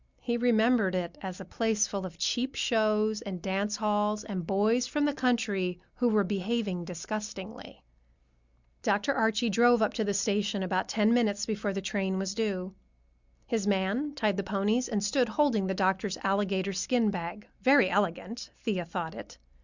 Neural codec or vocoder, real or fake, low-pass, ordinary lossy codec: none; real; 7.2 kHz; Opus, 64 kbps